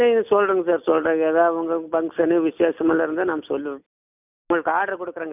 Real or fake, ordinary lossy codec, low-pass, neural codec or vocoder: real; none; 3.6 kHz; none